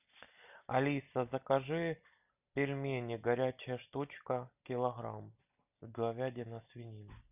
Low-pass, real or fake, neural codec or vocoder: 3.6 kHz; real; none